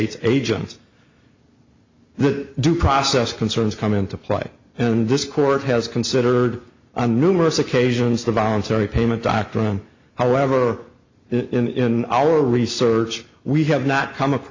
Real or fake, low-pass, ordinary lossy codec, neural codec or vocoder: real; 7.2 kHz; MP3, 64 kbps; none